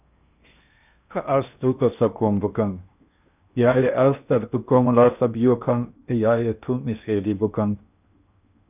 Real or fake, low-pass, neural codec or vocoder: fake; 3.6 kHz; codec, 16 kHz in and 24 kHz out, 0.8 kbps, FocalCodec, streaming, 65536 codes